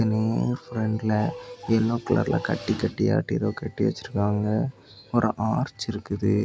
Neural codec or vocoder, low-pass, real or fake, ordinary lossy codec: none; none; real; none